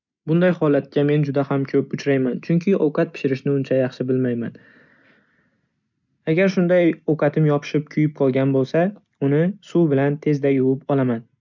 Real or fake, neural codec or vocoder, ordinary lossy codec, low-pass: real; none; none; 7.2 kHz